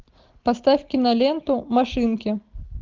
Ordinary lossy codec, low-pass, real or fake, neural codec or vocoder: Opus, 32 kbps; 7.2 kHz; real; none